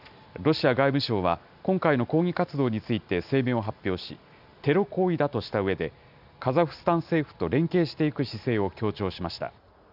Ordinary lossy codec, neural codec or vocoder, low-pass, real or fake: none; none; 5.4 kHz; real